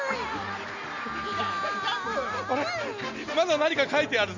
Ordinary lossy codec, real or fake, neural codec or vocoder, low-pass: MP3, 64 kbps; real; none; 7.2 kHz